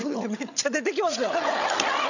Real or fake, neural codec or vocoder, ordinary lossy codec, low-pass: fake; codec, 16 kHz, 16 kbps, FreqCodec, larger model; none; 7.2 kHz